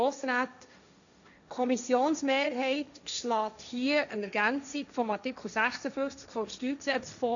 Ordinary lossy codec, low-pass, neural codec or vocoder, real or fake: none; 7.2 kHz; codec, 16 kHz, 1.1 kbps, Voila-Tokenizer; fake